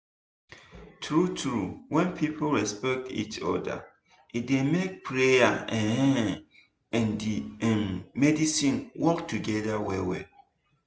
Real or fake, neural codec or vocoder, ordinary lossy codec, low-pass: real; none; none; none